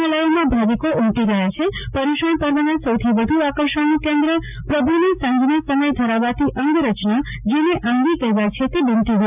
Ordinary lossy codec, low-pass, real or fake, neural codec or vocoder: none; 3.6 kHz; real; none